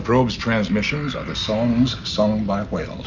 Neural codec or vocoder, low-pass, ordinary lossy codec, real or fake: none; 7.2 kHz; Opus, 64 kbps; real